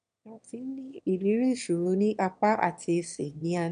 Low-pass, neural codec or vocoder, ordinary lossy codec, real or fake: 9.9 kHz; autoencoder, 22.05 kHz, a latent of 192 numbers a frame, VITS, trained on one speaker; none; fake